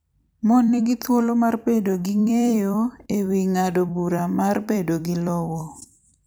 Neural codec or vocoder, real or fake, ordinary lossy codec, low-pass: vocoder, 44.1 kHz, 128 mel bands every 256 samples, BigVGAN v2; fake; none; none